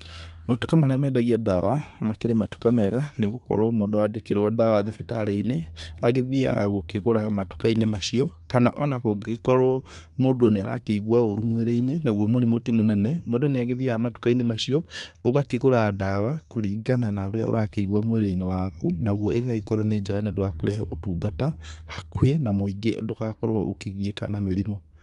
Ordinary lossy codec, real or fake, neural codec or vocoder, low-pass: none; fake; codec, 24 kHz, 1 kbps, SNAC; 10.8 kHz